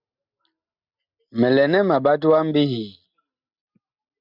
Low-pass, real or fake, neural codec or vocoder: 5.4 kHz; real; none